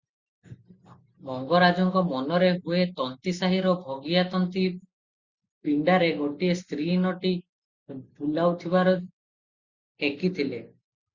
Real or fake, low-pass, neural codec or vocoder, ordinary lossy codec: real; 7.2 kHz; none; Opus, 64 kbps